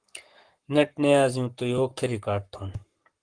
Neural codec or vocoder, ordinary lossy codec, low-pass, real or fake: codec, 44.1 kHz, 7.8 kbps, Pupu-Codec; Opus, 24 kbps; 9.9 kHz; fake